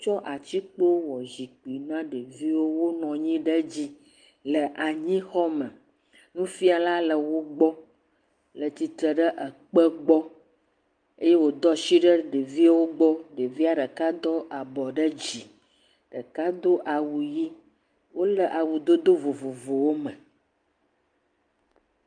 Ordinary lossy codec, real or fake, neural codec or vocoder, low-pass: Opus, 32 kbps; real; none; 9.9 kHz